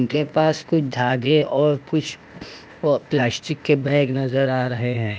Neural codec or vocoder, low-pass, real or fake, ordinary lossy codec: codec, 16 kHz, 0.8 kbps, ZipCodec; none; fake; none